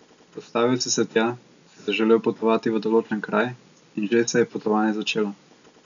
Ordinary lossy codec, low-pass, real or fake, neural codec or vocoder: none; 7.2 kHz; real; none